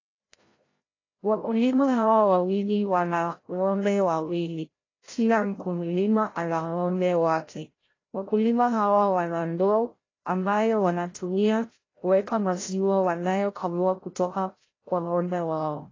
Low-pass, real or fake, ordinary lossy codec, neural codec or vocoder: 7.2 kHz; fake; AAC, 32 kbps; codec, 16 kHz, 0.5 kbps, FreqCodec, larger model